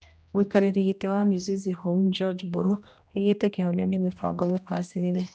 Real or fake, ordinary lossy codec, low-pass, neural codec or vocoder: fake; none; none; codec, 16 kHz, 1 kbps, X-Codec, HuBERT features, trained on general audio